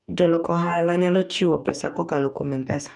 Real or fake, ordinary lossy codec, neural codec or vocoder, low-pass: fake; none; codec, 44.1 kHz, 2.6 kbps, DAC; 10.8 kHz